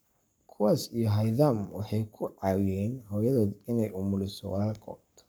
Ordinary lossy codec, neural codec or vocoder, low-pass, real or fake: none; codec, 44.1 kHz, 7.8 kbps, Pupu-Codec; none; fake